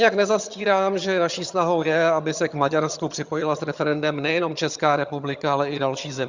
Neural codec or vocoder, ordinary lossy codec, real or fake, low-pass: vocoder, 22.05 kHz, 80 mel bands, HiFi-GAN; Opus, 64 kbps; fake; 7.2 kHz